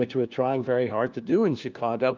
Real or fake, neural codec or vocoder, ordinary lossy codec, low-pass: fake; codec, 16 kHz, 1 kbps, FunCodec, trained on LibriTTS, 50 frames a second; Opus, 24 kbps; 7.2 kHz